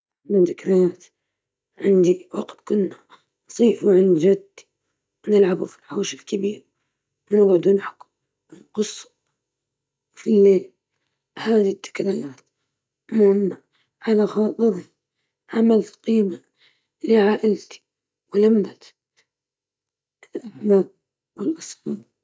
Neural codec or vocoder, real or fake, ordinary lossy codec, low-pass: none; real; none; none